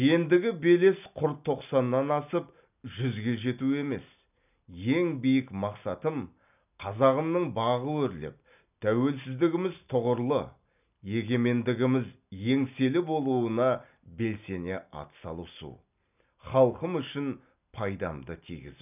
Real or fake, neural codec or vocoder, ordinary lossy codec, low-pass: real; none; none; 3.6 kHz